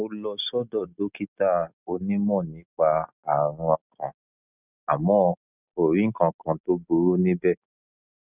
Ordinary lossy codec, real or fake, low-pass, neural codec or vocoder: none; real; 3.6 kHz; none